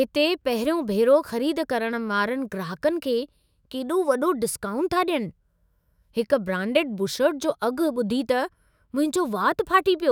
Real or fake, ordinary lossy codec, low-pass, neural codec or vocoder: fake; none; none; autoencoder, 48 kHz, 128 numbers a frame, DAC-VAE, trained on Japanese speech